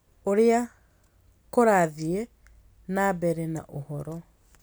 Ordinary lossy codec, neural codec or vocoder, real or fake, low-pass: none; none; real; none